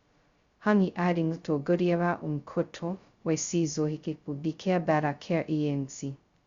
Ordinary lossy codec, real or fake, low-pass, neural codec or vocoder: Opus, 64 kbps; fake; 7.2 kHz; codec, 16 kHz, 0.2 kbps, FocalCodec